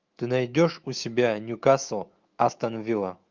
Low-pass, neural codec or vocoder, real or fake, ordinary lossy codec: 7.2 kHz; autoencoder, 48 kHz, 128 numbers a frame, DAC-VAE, trained on Japanese speech; fake; Opus, 24 kbps